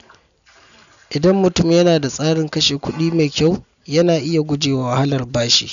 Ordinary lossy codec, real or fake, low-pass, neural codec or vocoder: none; real; 7.2 kHz; none